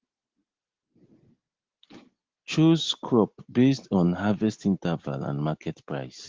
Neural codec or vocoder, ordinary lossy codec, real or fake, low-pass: none; Opus, 16 kbps; real; 7.2 kHz